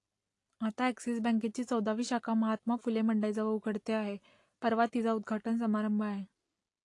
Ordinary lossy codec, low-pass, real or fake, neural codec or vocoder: AAC, 48 kbps; 10.8 kHz; real; none